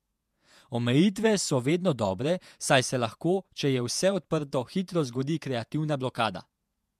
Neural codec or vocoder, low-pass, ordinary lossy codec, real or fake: vocoder, 48 kHz, 128 mel bands, Vocos; 14.4 kHz; MP3, 96 kbps; fake